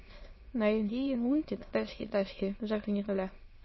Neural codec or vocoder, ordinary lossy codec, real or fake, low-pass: autoencoder, 22.05 kHz, a latent of 192 numbers a frame, VITS, trained on many speakers; MP3, 24 kbps; fake; 7.2 kHz